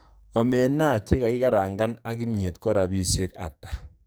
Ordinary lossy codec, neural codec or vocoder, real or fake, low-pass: none; codec, 44.1 kHz, 2.6 kbps, SNAC; fake; none